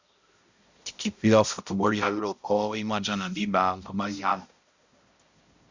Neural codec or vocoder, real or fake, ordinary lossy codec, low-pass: codec, 16 kHz, 0.5 kbps, X-Codec, HuBERT features, trained on balanced general audio; fake; Opus, 64 kbps; 7.2 kHz